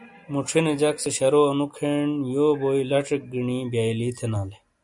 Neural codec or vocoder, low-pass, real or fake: none; 10.8 kHz; real